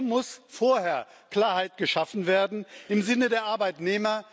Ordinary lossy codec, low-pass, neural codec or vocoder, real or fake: none; none; none; real